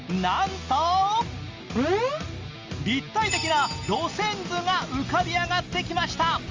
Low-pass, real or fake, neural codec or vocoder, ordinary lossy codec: 7.2 kHz; real; none; Opus, 32 kbps